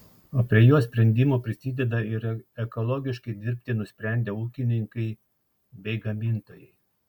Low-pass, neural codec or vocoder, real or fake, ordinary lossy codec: 19.8 kHz; none; real; MP3, 96 kbps